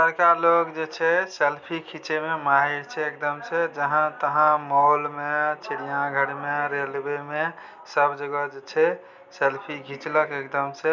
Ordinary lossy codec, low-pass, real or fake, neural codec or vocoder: none; 7.2 kHz; real; none